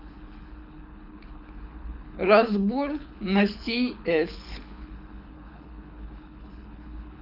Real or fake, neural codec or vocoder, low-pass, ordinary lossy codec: fake; codec, 24 kHz, 6 kbps, HILCodec; 5.4 kHz; none